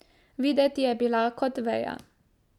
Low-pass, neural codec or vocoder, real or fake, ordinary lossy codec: 19.8 kHz; none; real; none